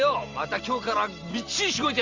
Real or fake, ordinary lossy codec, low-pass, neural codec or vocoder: real; Opus, 32 kbps; 7.2 kHz; none